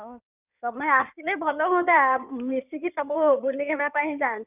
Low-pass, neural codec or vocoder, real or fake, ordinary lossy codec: 3.6 kHz; codec, 16 kHz in and 24 kHz out, 2.2 kbps, FireRedTTS-2 codec; fake; none